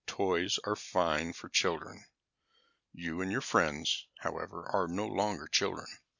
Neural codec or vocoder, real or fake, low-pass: none; real; 7.2 kHz